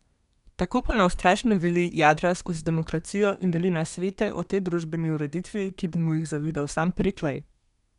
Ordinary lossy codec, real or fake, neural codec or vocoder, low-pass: none; fake; codec, 24 kHz, 1 kbps, SNAC; 10.8 kHz